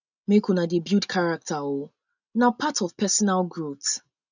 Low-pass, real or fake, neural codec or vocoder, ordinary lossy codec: 7.2 kHz; real; none; none